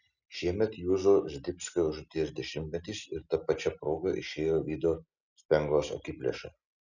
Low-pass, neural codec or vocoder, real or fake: 7.2 kHz; none; real